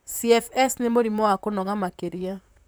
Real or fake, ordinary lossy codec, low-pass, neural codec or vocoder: fake; none; none; vocoder, 44.1 kHz, 128 mel bands, Pupu-Vocoder